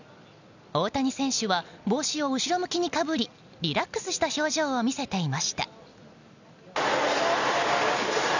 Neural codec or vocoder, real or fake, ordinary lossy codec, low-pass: none; real; none; 7.2 kHz